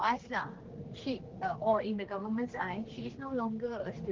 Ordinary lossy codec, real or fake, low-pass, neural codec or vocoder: Opus, 16 kbps; fake; 7.2 kHz; codec, 16 kHz, 2 kbps, X-Codec, HuBERT features, trained on general audio